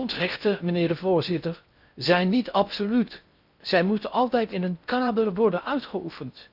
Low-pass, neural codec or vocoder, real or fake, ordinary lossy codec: 5.4 kHz; codec, 16 kHz in and 24 kHz out, 0.6 kbps, FocalCodec, streaming, 2048 codes; fake; none